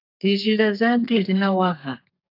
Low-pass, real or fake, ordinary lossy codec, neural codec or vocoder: 5.4 kHz; fake; AAC, 32 kbps; codec, 32 kHz, 1.9 kbps, SNAC